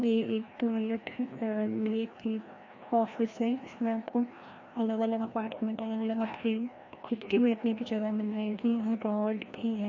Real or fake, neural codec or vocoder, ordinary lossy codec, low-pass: fake; codec, 16 kHz, 1 kbps, FreqCodec, larger model; MP3, 48 kbps; 7.2 kHz